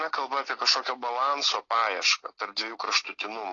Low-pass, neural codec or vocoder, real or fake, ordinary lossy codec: 7.2 kHz; none; real; AAC, 32 kbps